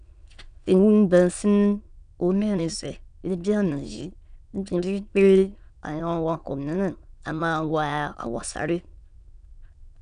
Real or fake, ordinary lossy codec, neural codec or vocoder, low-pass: fake; none; autoencoder, 22.05 kHz, a latent of 192 numbers a frame, VITS, trained on many speakers; 9.9 kHz